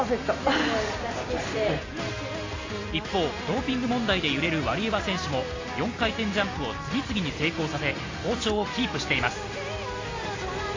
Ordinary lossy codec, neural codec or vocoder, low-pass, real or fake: AAC, 32 kbps; none; 7.2 kHz; real